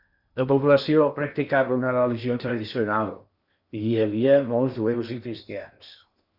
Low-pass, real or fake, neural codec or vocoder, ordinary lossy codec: 5.4 kHz; fake; codec, 16 kHz in and 24 kHz out, 0.8 kbps, FocalCodec, streaming, 65536 codes; Opus, 64 kbps